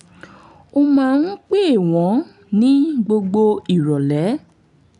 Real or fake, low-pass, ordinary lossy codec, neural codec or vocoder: real; 10.8 kHz; none; none